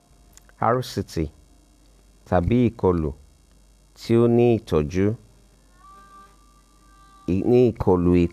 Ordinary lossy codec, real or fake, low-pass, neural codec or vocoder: none; real; 14.4 kHz; none